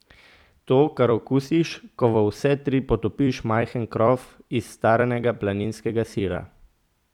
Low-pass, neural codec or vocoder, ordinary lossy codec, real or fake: 19.8 kHz; vocoder, 44.1 kHz, 128 mel bands every 256 samples, BigVGAN v2; none; fake